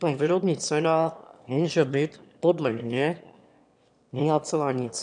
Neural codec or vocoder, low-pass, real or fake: autoencoder, 22.05 kHz, a latent of 192 numbers a frame, VITS, trained on one speaker; 9.9 kHz; fake